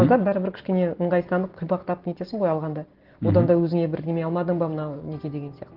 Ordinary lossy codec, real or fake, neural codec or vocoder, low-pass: Opus, 16 kbps; real; none; 5.4 kHz